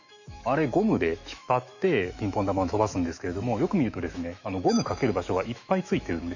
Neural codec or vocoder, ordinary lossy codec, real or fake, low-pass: autoencoder, 48 kHz, 128 numbers a frame, DAC-VAE, trained on Japanese speech; none; fake; 7.2 kHz